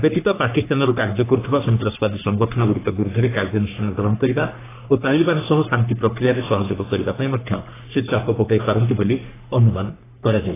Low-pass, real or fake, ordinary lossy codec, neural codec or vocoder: 3.6 kHz; fake; AAC, 16 kbps; codec, 44.1 kHz, 3.4 kbps, Pupu-Codec